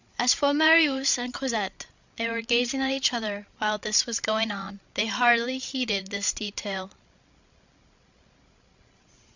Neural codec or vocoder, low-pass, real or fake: codec, 16 kHz, 16 kbps, FreqCodec, larger model; 7.2 kHz; fake